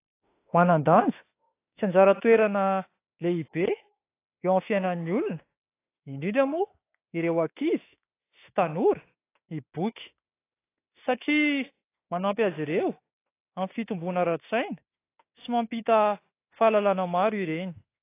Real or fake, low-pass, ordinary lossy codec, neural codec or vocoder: fake; 3.6 kHz; AAC, 24 kbps; autoencoder, 48 kHz, 32 numbers a frame, DAC-VAE, trained on Japanese speech